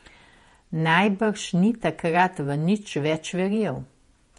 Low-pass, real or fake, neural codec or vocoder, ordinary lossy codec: 19.8 kHz; fake; vocoder, 48 kHz, 128 mel bands, Vocos; MP3, 48 kbps